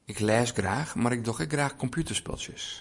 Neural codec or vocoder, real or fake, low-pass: vocoder, 44.1 kHz, 128 mel bands every 512 samples, BigVGAN v2; fake; 10.8 kHz